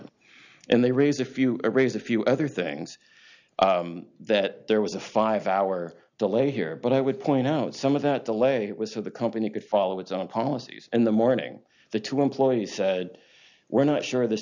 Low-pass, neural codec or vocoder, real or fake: 7.2 kHz; none; real